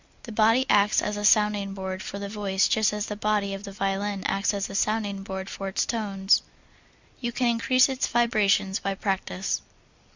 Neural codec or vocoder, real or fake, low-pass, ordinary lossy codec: none; real; 7.2 kHz; Opus, 64 kbps